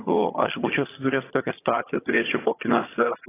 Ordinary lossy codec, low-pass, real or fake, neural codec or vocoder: AAC, 16 kbps; 3.6 kHz; fake; vocoder, 22.05 kHz, 80 mel bands, HiFi-GAN